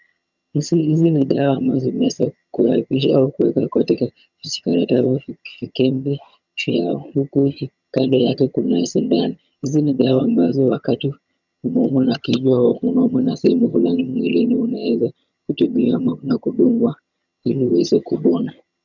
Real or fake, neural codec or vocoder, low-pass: fake; vocoder, 22.05 kHz, 80 mel bands, HiFi-GAN; 7.2 kHz